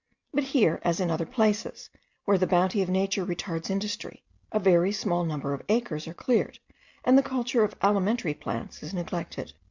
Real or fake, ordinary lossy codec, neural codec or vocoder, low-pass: real; Opus, 64 kbps; none; 7.2 kHz